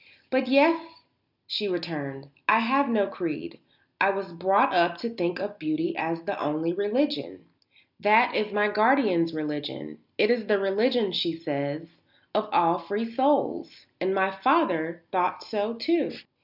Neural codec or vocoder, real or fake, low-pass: none; real; 5.4 kHz